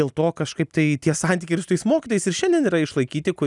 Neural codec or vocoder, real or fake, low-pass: none; real; 10.8 kHz